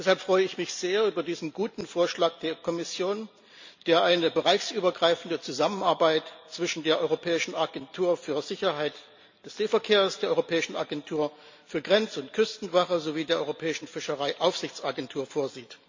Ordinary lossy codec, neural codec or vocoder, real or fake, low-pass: MP3, 64 kbps; none; real; 7.2 kHz